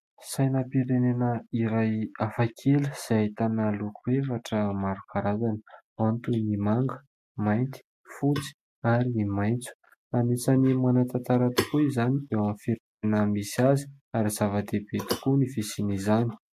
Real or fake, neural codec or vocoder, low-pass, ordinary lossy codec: real; none; 14.4 kHz; MP3, 64 kbps